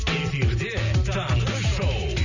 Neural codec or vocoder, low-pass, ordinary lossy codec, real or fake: none; 7.2 kHz; none; real